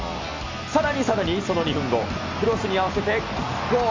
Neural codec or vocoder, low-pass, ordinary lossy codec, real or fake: none; 7.2 kHz; MP3, 48 kbps; real